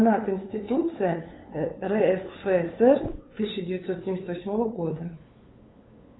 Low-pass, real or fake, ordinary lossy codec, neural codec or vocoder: 7.2 kHz; fake; AAC, 16 kbps; codec, 16 kHz, 8 kbps, FunCodec, trained on LibriTTS, 25 frames a second